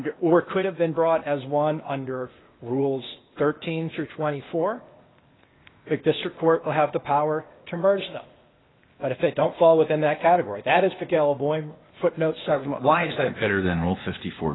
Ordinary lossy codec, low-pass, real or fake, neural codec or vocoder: AAC, 16 kbps; 7.2 kHz; fake; codec, 16 kHz, 0.8 kbps, ZipCodec